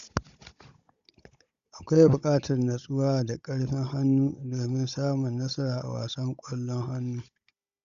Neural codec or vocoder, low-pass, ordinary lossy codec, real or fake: codec, 16 kHz, 16 kbps, FunCodec, trained on Chinese and English, 50 frames a second; 7.2 kHz; Opus, 64 kbps; fake